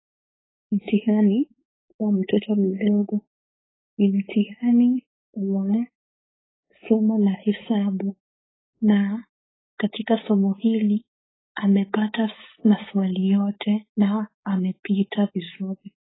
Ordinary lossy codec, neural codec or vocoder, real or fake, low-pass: AAC, 16 kbps; codec, 16 kHz, 4.8 kbps, FACodec; fake; 7.2 kHz